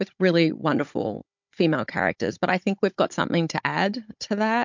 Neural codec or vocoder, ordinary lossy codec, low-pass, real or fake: codec, 16 kHz, 16 kbps, FunCodec, trained on Chinese and English, 50 frames a second; MP3, 64 kbps; 7.2 kHz; fake